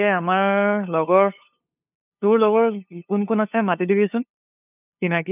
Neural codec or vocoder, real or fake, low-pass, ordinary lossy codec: codec, 16 kHz, 2 kbps, FunCodec, trained on LibriTTS, 25 frames a second; fake; 3.6 kHz; none